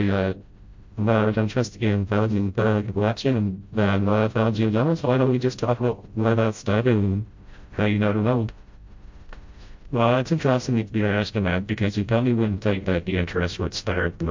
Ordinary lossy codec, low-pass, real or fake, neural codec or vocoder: MP3, 48 kbps; 7.2 kHz; fake; codec, 16 kHz, 0.5 kbps, FreqCodec, smaller model